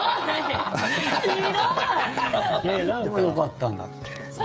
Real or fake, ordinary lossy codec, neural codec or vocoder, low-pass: fake; none; codec, 16 kHz, 16 kbps, FreqCodec, smaller model; none